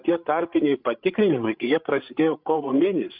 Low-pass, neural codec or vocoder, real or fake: 5.4 kHz; codec, 16 kHz, 8 kbps, FreqCodec, larger model; fake